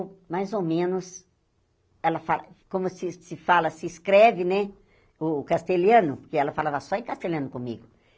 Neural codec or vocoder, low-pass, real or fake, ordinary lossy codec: none; none; real; none